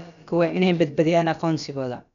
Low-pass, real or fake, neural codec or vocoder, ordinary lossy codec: 7.2 kHz; fake; codec, 16 kHz, about 1 kbps, DyCAST, with the encoder's durations; none